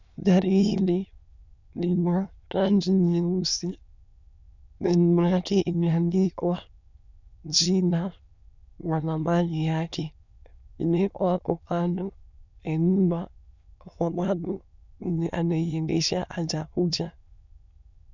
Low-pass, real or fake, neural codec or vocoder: 7.2 kHz; fake; autoencoder, 22.05 kHz, a latent of 192 numbers a frame, VITS, trained on many speakers